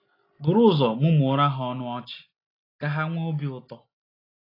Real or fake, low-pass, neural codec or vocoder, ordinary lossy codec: real; 5.4 kHz; none; AAC, 24 kbps